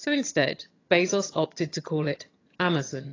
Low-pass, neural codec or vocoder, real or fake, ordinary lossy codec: 7.2 kHz; vocoder, 22.05 kHz, 80 mel bands, HiFi-GAN; fake; AAC, 32 kbps